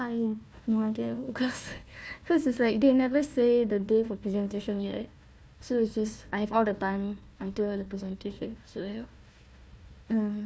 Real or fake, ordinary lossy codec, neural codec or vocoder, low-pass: fake; none; codec, 16 kHz, 1 kbps, FunCodec, trained on Chinese and English, 50 frames a second; none